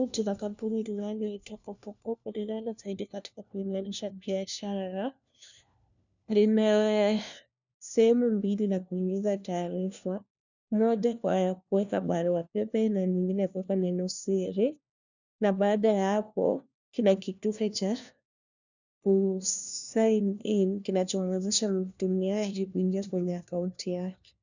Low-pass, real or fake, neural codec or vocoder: 7.2 kHz; fake; codec, 16 kHz, 1 kbps, FunCodec, trained on LibriTTS, 50 frames a second